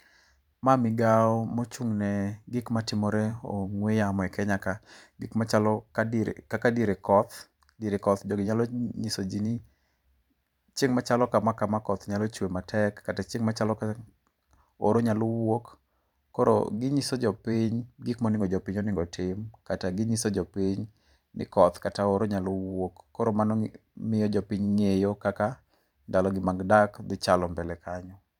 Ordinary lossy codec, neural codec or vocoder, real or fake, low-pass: none; vocoder, 44.1 kHz, 128 mel bands every 512 samples, BigVGAN v2; fake; 19.8 kHz